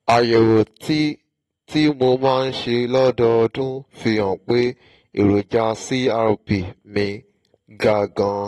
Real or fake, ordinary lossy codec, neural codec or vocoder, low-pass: real; AAC, 32 kbps; none; 19.8 kHz